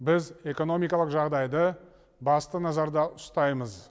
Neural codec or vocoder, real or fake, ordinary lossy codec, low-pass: none; real; none; none